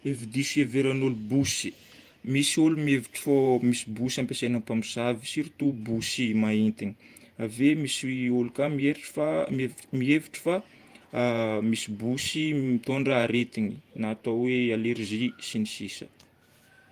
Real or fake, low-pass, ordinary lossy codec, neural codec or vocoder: fake; 14.4 kHz; Opus, 32 kbps; vocoder, 48 kHz, 128 mel bands, Vocos